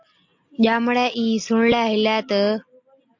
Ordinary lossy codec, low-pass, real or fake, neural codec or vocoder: AAC, 48 kbps; 7.2 kHz; real; none